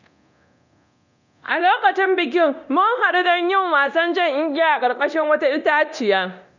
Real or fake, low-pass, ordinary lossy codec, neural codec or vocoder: fake; 7.2 kHz; none; codec, 24 kHz, 0.9 kbps, DualCodec